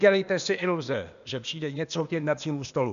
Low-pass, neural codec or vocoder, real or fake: 7.2 kHz; codec, 16 kHz, 0.8 kbps, ZipCodec; fake